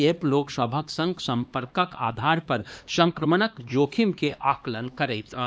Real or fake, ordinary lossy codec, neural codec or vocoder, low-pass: fake; none; codec, 16 kHz, 2 kbps, X-Codec, HuBERT features, trained on LibriSpeech; none